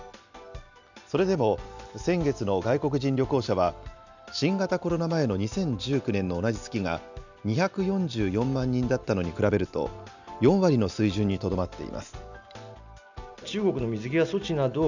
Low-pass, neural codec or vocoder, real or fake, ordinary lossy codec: 7.2 kHz; none; real; none